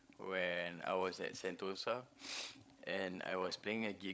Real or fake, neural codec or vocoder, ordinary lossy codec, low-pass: fake; codec, 16 kHz, 16 kbps, FreqCodec, larger model; none; none